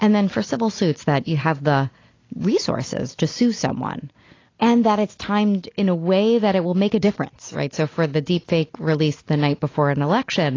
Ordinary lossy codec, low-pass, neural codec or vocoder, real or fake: AAC, 32 kbps; 7.2 kHz; none; real